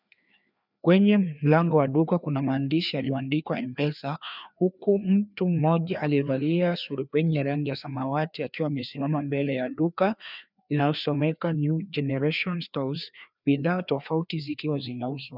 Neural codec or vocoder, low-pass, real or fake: codec, 16 kHz, 2 kbps, FreqCodec, larger model; 5.4 kHz; fake